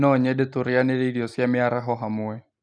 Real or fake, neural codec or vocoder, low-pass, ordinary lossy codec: real; none; 9.9 kHz; none